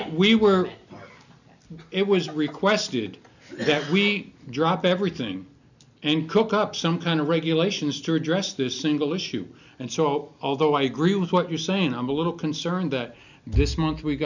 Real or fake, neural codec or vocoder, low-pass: real; none; 7.2 kHz